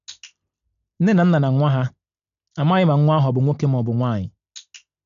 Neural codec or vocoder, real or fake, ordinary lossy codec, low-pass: none; real; none; 7.2 kHz